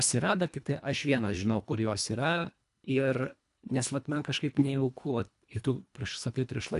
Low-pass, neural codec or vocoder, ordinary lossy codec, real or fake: 10.8 kHz; codec, 24 kHz, 1.5 kbps, HILCodec; AAC, 96 kbps; fake